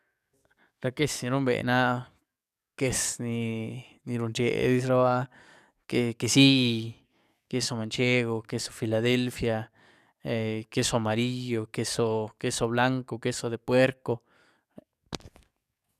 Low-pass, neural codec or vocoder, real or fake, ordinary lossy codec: 14.4 kHz; codec, 44.1 kHz, 7.8 kbps, DAC; fake; none